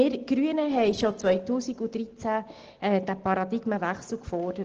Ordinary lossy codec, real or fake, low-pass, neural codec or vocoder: Opus, 16 kbps; real; 7.2 kHz; none